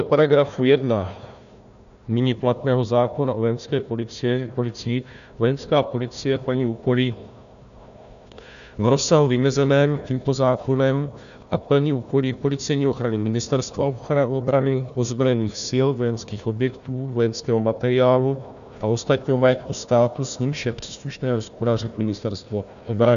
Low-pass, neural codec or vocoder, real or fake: 7.2 kHz; codec, 16 kHz, 1 kbps, FunCodec, trained on Chinese and English, 50 frames a second; fake